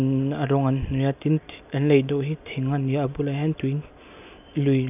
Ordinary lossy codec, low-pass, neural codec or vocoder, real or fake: none; 3.6 kHz; none; real